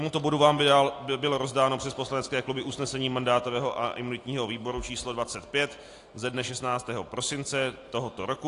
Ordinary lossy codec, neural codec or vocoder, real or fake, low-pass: AAC, 48 kbps; none; real; 10.8 kHz